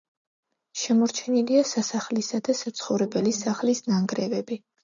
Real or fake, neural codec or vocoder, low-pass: real; none; 7.2 kHz